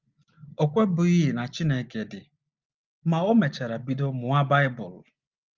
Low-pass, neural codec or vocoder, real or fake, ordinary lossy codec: 7.2 kHz; none; real; Opus, 32 kbps